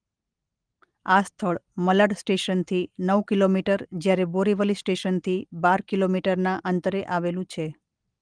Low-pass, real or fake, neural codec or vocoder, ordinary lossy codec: 9.9 kHz; real; none; Opus, 24 kbps